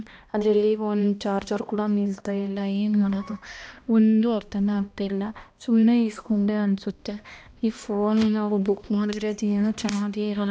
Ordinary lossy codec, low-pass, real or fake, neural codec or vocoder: none; none; fake; codec, 16 kHz, 1 kbps, X-Codec, HuBERT features, trained on balanced general audio